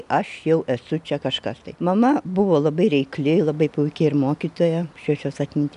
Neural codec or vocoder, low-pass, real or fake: none; 10.8 kHz; real